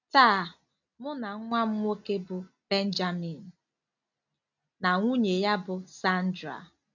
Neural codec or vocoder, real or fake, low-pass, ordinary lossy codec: none; real; 7.2 kHz; none